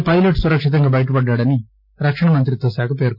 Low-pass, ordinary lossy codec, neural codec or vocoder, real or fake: 5.4 kHz; none; none; real